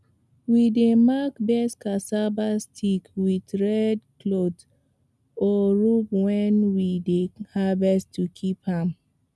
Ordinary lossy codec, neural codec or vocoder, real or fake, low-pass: none; none; real; none